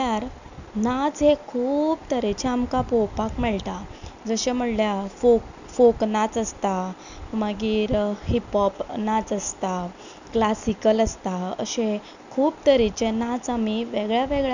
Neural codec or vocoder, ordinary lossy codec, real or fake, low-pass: none; none; real; 7.2 kHz